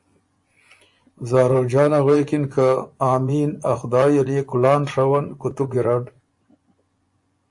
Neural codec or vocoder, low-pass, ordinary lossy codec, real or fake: vocoder, 24 kHz, 100 mel bands, Vocos; 10.8 kHz; AAC, 64 kbps; fake